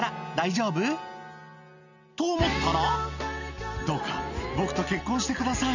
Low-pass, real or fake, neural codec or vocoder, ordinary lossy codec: 7.2 kHz; real; none; none